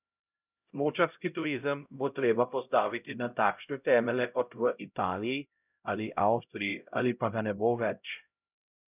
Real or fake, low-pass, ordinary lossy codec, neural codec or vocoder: fake; 3.6 kHz; none; codec, 16 kHz, 0.5 kbps, X-Codec, HuBERT features, trained on LibriSpeech